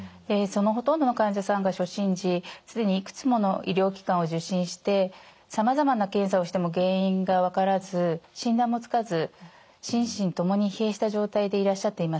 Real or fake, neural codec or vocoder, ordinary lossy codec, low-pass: real; none; none; none